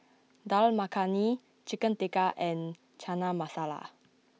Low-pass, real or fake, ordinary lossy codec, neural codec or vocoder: none; real; none; none